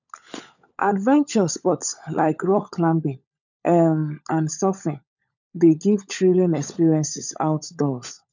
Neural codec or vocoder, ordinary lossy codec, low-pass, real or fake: codec, 16 kHz, 16 kbps, FunCodec, trained on LibriTTS, 50 frames a second; none; 7.2 kHz; fake